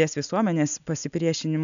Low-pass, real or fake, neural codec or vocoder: 7.2 kHz; real; none